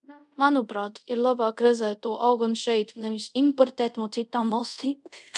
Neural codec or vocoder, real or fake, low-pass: codec, 24 kHz, 0.5 kbps, DualCodec; fake; 10.8 kHz